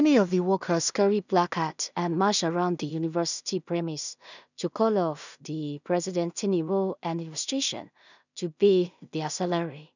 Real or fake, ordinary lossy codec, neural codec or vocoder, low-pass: fake; none; codec, 16 kHz in and 24 kHz out, 0.4 kbps, LongCat-Audio-Codec, two codebook decoder; 7.2 kHz